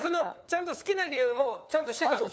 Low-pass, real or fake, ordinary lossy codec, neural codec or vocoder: none; fake; none; codec, 16 kHz, 4 kbps, FunCodec, trained on LibriTTS, 50 frames a second